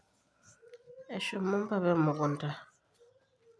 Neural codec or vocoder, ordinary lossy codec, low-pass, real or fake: none; none; none; real